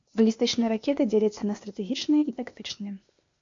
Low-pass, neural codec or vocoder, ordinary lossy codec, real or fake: 7.2 kHz; codec, 16 kHz, 0.8 kbps, ZipCodec; MP3, 48 kbps; fake